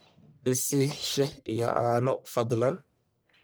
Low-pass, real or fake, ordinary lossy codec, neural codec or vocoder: none; fake; none; codec, 44.1 kHz, 1.7 kbps, Pupu-Codec